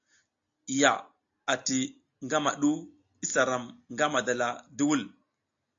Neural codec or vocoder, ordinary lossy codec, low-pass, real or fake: none; AAC, 48 kbps; 7.2 kHz; real